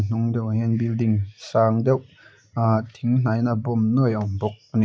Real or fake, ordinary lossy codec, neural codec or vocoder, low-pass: real; none; none; none